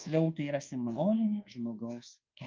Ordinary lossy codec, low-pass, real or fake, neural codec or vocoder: Opus, 24 kbps; 7.2 kHz; fake; codec, 24 kHz, 1.2 kbps, DualCodec